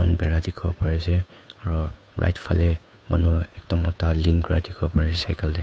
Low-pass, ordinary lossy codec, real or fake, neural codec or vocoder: none; none; fake; codec, 16 kHz, 2 kbps, FunCodec, trained on Chinese and English, 25 frames a second